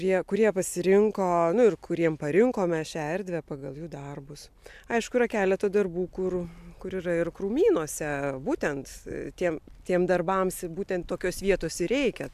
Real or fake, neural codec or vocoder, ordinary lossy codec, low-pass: real; none; AAC, 96 kbps; 14.4 kHz